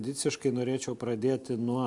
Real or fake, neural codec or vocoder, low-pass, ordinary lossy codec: real; none; 10.8 kHz; MP3, 64 kbps